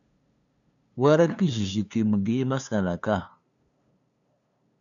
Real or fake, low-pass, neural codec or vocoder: fake; 7.2 kHz; codec, 16 kHz, 2 kbps, FunCodec, trained on LibriTTS, 25 frames a second